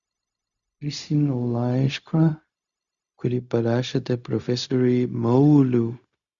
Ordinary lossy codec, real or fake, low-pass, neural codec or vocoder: Opus, 64 kbps; fake; 7.2 kHz; codec, 16 kHz, 0.4 kbps, LongCat-Audio-Codec